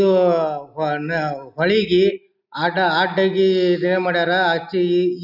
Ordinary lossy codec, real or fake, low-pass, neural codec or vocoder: none; real; 5.4 kHz; none